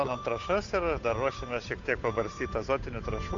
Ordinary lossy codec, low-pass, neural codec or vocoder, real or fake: AAC, 48 kbps; 7.2 kHz; codec, 16 kHz, 8 kbps, FunCodec, trained on Chinese and English, 25 frames a second; fake